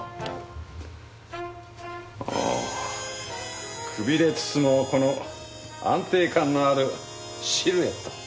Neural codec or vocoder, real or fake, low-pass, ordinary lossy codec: none; real; none; none